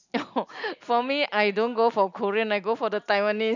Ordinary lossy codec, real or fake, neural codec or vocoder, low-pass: none; real; none; 7.2 kHz